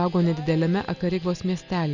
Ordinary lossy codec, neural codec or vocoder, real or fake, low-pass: Opus, 64 kbps; none; real; 7.2 kHz